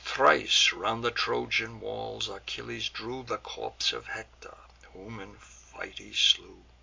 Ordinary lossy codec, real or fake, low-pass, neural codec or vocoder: MP3, 64 kbps; real; 7.2 kHz; none